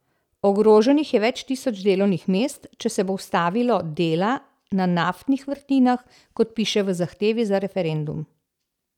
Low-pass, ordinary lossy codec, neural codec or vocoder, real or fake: 19.8 kHz; none; none; real